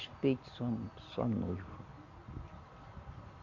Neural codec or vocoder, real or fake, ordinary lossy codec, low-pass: none; real; none; 7.2 kHz